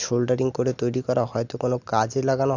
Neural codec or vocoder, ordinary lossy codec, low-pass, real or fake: none; none; 7.2 kHz; real